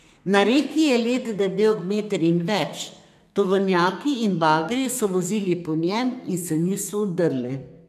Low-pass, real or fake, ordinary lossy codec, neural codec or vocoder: 14.4 kHz; fake; none; codec, 32 kHz, 1.9 kbps, SNAC